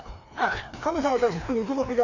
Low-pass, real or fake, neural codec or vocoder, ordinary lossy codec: 7.2 kHz; fake; codec, 16 kHz, 2 kbps, FreqCodec, larger model; Opus, 64 kbps